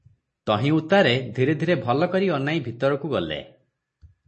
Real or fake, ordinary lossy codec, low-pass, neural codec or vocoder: real; MP3, 32 kbps; 10.8 kHz; none